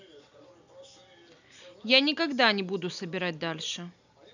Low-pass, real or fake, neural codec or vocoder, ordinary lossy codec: 7.2 kHz; real; none; none